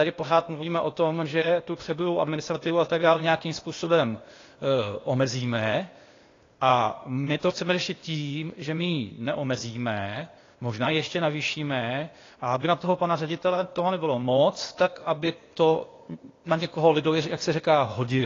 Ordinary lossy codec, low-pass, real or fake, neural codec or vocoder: AAC, 32 kbps; 7.2 kHz; fake; codec, 16 kHz, 0.8 kbps, ZipCodec